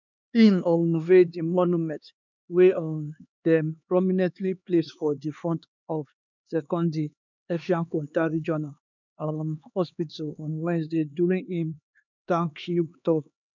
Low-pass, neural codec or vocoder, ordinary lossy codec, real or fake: 7.2 kHz; codec, 16 kHz, 2 kbps, X-Codec, HuBERT features, trained on LibriSpeech; none; fake